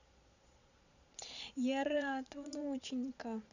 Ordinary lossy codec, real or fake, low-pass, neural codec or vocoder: none; fake; 7.2 kHz; vocoder, 22.05 kHz, 80 mel bands, Vocos